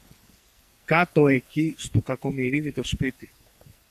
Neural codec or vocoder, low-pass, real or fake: codec, 44.1 kHz, 2.6 kbps, SNAC; 14.4 kHz; fake